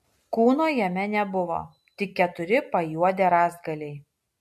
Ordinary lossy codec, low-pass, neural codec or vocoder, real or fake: MP3, 64 kbps; 14.4 kHz; none; real